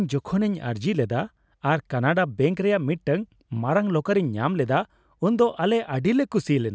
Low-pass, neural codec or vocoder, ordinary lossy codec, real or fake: none; none; none; real